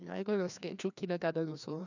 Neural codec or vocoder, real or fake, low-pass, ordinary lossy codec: codec, 16 kHz, 2 kbps, FreqCodec, larger model; fake; 7.2 kHz; none